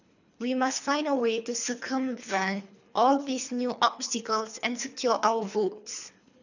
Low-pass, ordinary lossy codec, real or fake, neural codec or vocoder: 7.2 kHz; none; fake; codec, 24 kHz, 3 kbps, HILCodec